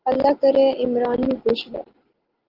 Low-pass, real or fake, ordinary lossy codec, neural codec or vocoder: 5.4 kHz; real; Opus, 16 kbps; none